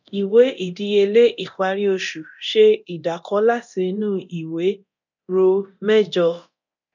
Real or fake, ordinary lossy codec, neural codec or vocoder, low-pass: fake; none; codec, 24 kHz, 0.9 kbps, DualCodec; 7.2 kHz